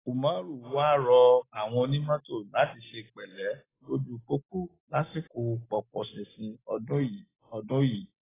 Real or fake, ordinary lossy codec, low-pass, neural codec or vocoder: real; AAC, 16 kbps; 3.6 kHz; none